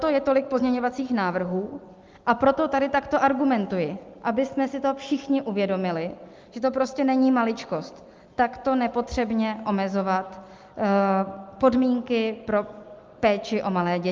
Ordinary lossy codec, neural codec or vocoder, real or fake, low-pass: Opus, 32 kbps; none; real; 7.2 kHz